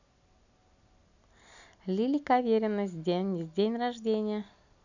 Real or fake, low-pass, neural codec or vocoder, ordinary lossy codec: real; 7.2 kHz; none; none